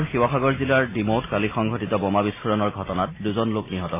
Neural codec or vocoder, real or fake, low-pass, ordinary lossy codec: none; real; 3.6 kHz; MP3, 16 kbps